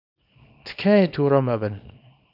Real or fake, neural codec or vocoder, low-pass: fake; codec, 24 kHz, 0.9 kbps, WavTokenizer, small release; 5.4 kHz